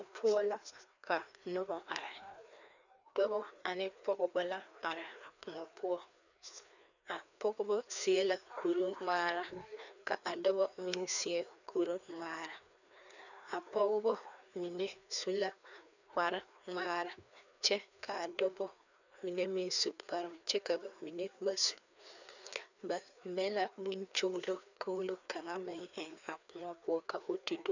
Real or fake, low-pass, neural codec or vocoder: fake; 7.2 kHz; codec, 16 kHz, 2 kbps, FreqCodec, larger model